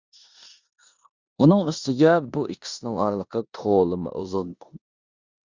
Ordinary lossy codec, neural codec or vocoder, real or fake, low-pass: Opus, 64 kbps; codec, 16 kHz in and 24 kHz out, 0.9 kbps, LongCat-Audio-Codec, four codebook decoder; fake; 7.2 kHz